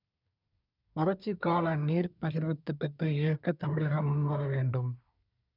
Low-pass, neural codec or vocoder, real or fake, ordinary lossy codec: 5.4 kHz; codec, 24 kHz, 1 kbps, SNAC; fake; none